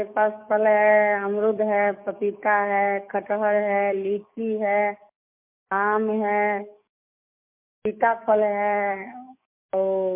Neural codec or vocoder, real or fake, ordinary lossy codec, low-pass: codec, 44.1 kHz, 7.8 kbps, DAC; fake; MP3, 32 kbps; 3.6 kHz